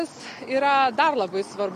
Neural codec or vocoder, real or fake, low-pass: none; real; 14.4 kHz